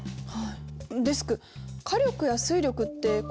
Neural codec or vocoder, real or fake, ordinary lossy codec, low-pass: none; real; none; none